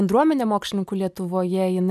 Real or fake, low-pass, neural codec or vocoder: real; 14.4 kHz; none